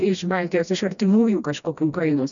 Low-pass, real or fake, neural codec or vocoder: 7.2 kHz; fake; codec, 16 kHz, 1 kbps, FreqCodec, smaller model